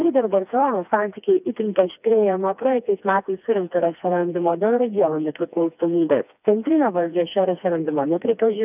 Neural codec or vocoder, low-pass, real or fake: codec, 16 kHz, 2 kbps, FreqCodec, smaller model; 3.6 kHz; fake